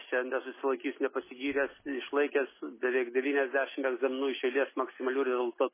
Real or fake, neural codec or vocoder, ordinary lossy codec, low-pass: real; none; MP3, 16 kbps; 3.6 kHz